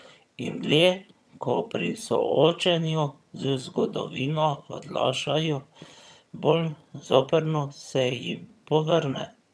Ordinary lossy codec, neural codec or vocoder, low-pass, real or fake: none; vocoder, 22.05 kHz, 80 mel bands, HiFi-GAN; none; fake